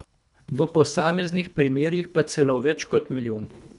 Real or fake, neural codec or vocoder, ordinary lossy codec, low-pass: fake; codec, 24 kHz, 1.5 kbps, HILCodec; none; 10.8 kHz